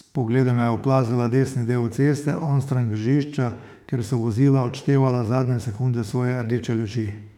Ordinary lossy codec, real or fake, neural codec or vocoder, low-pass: none; fake; autoencoder, 48 kHz, 32 numbers a frame, DAC-VAE, trained on Japanese speech; 19.8 kHz